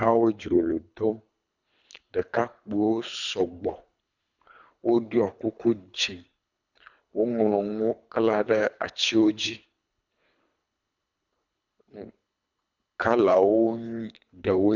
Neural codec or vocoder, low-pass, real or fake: codec, 24 kHz, 3 kbps, HILCodec; 7.2 kHz; fake